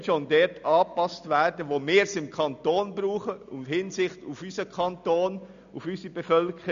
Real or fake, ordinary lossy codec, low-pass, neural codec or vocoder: real; none; 7.2 kHz; none